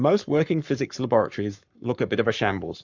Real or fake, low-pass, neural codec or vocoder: fake; 7.2 kHz; codec, 44.1 kHz, 7.8 kbps, Pupu-Codec